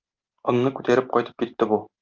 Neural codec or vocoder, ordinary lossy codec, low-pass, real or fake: none; Opus, 32 kbps; 7.2 kHz; real